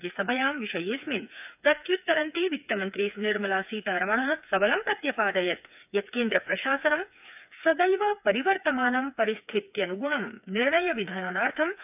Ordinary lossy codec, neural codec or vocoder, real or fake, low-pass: none; codec, 16 kHz, 4 kbps, FreqCodec, smaller model; fake; 3.6 kHz